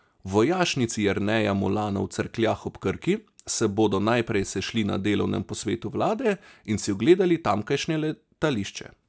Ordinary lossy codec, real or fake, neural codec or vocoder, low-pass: none; real; none; none